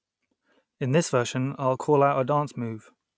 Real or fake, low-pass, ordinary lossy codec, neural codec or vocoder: real; none; none; none